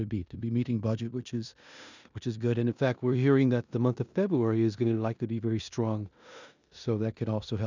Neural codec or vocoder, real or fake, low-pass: codec, 16 kHz in and 24 kHz out, 0.9 kbps, LongCat-Audio-Codec, four codebook decoder; fake; 7.2 kHz